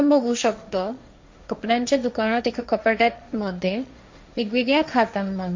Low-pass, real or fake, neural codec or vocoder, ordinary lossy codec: 7.2 kHz; fake; codec, 16 kHz, 1.1 kbps, Voila-Tokenizer; MP3, 48 kbps